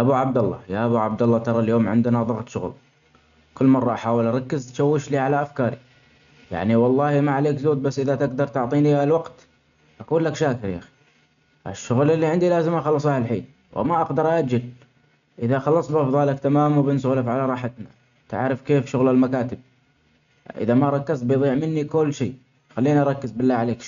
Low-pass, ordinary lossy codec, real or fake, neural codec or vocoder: 7.2 kHz; none; real; none